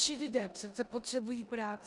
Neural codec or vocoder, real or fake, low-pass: codec, 16 kHz in and 24 kHz out, 0.9 kbps, LongCat-Audio-Codec, four codebook decoder; fake; 10.8 kHz